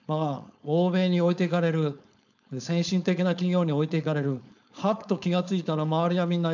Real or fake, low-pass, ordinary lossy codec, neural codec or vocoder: fake; 7.2 kHz; none; codec, 16 kHz, 4.8 kbps, FACodec